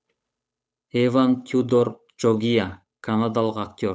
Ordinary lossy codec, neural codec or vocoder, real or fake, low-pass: none; codec, 16 kHz, 8 kbps, FunCodec, trained on Chinese and English, 25 frames a second; fake; none